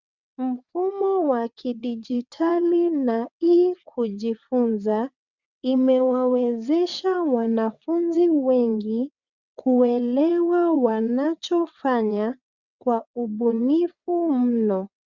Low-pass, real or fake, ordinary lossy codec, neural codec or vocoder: 7.2 kHz; fake; Opus, 64 kbps; vocoder, 22.05 kHz, 80 mel bands, WaveNeXt